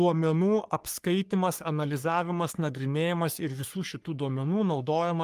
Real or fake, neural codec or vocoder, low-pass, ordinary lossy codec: fake; codec, 44.1 kHz, 3.4 kbps, Pupu-Codec; 14.4 kHz; Opus, 32 kbps